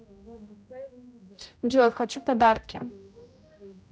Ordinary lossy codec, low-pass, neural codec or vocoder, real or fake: none; none; codec, 16 kHz, 0.5 kbps, X-Codec, HuBERT features, trained on general audio; fake